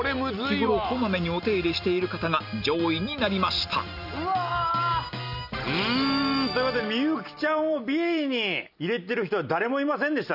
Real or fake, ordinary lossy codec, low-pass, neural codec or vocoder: real; none; 5.4 kHz; none